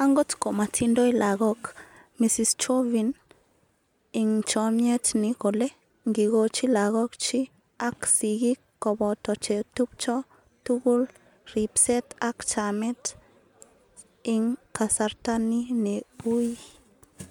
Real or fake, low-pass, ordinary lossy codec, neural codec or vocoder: real; 19.8 kHz; MP3, 96 kbps; none